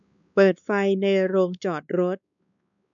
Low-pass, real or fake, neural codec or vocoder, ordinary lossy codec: 7.2 kHz; fake; codec, 16 kHz, 4 kbps, X-Codec, WavLM features, trained on Multilingual LibriSpeech; none